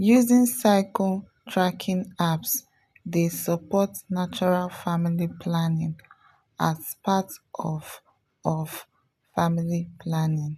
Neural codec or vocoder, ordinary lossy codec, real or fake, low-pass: none; none; real; 14.4 kHz